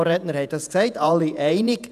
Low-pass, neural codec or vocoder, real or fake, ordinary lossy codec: 14.4 kHz; vocoder, 48 kHz, 128 mel bands, Vocos; fake; none